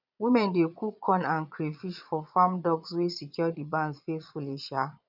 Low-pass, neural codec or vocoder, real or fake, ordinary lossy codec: 5.4 kHz; vocoder, 22.05 kHz, 80 mel bands, Vocos; fake; none